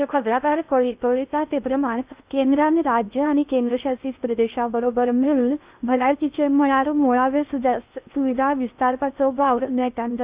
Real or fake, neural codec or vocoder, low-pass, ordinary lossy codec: fake; codec, 16 kHz in and 24 kHz out, 0.6 kbps, FocalCodec, streaming, 4096 codes; 3.6 kHz; Opus, 64 kbps